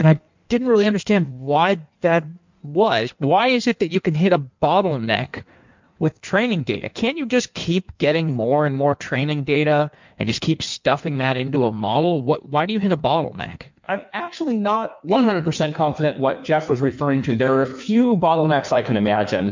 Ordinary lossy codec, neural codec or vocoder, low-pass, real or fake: MP3, 64 kbps; codec, 16 kHz in and 24 kHz out, 1.1 kbps, FireRedTTS-2 codec; 7.2 kHz; fake